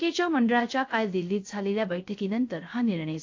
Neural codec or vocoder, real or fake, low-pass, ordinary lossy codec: codec, 16 kHz, 0.3 kbps, FocalCodec; fake; 7.2 kHz; AAC, 48 kbps